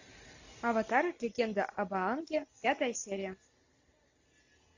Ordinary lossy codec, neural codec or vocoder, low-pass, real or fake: AAC, 48 kbps; none; 7.2 kHz; real